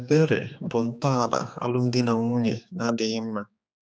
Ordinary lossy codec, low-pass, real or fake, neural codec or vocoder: none; none; fake; codec, 16 kHz, 2 kbps, X-Codec, HuBERT features, trained on general audio